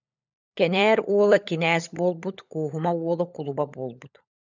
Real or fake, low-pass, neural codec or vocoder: fake; 7.2 kHz; codec, 16 kHz, 16 kbps, FunCodec, trained on LibriTTS, 50 frames a second